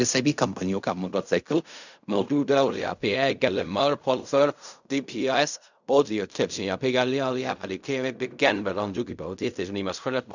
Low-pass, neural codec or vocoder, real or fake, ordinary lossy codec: 7.2 kHz; codec, 16 kHz in and 24 kHz out, 0.4 kbps, LongCat-Audio-Codec, fine tuned four codebook decoder; fake; none